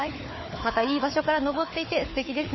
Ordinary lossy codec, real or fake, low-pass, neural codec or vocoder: MP3, 24 kbps; fake; 7.2 kHz; codec, 16 kHz, 4 kbps, FunCodec, trained on Chinese and English, 50 frames a second